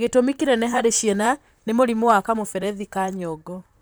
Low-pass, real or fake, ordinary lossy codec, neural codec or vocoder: none; fake; none; vocoder, 44.1 kHz, 128 mel bands, Pupu-Vocoder